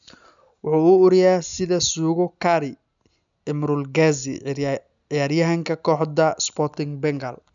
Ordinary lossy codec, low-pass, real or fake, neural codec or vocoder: none; 7.2 kHz; real; none